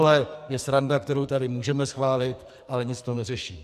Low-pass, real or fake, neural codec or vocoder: 14.4 kHz; fake; codec, 44.1 kHz, 2.6 kbps, SNAC